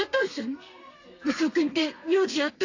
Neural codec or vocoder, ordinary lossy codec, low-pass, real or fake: codec, 32 kHz, 1.9 kbps, SNAC; AAC, 48 kbps; 7.2 kHz; fake